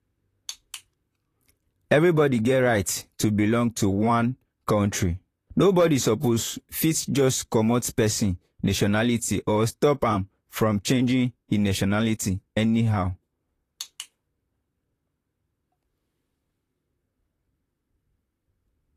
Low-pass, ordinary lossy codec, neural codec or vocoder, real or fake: 14.4 kHz; AAC, 48 kbps; vocoder, 44.1 kHz, 128 mel bands, Pupu-Vocoder; fake